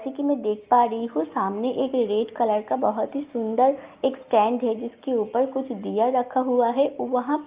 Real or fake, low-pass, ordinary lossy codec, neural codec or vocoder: real; 3.6 kHz; Opus, 32 kbps; none